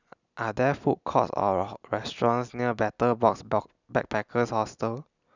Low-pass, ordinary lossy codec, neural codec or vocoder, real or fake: 7.2 kHz; none; none; real